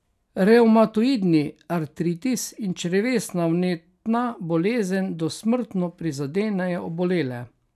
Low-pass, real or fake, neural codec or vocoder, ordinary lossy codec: 14.4 kHz; real; none; none